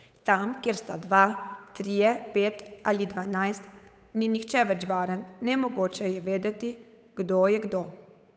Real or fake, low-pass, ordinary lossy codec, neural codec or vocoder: fake; none; none; codec, 16 kHz, 8 kbps, FunCodec, trained on Chinese and English, 25 frames a second